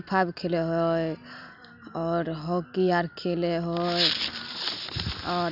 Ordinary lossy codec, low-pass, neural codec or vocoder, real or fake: none; 5.4 kHz; none; real